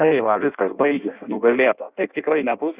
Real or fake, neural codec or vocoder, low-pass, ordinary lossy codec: fake; codec, 16 kHz in and 24 kHz out, 0.6 kbps, FireRedTTS-2 codec; 3.6 kHz; Opus, 64 kbps